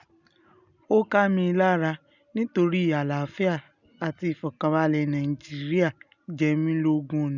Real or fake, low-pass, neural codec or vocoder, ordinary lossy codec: real; 7.2 kHz; none; none